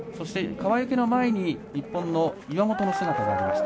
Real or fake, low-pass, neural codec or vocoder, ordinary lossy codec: real; none; none; none